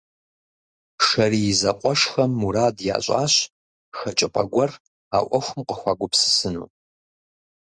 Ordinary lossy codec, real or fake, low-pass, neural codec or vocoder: Opus, 64 kbps; real; 9.9 kHz; none